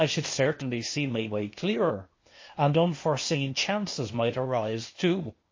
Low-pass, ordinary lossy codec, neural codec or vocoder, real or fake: 7.2 kHz; MP3, 32 kbps; codec, 16 kHz, 0.8 kbps, ZipCodec; fake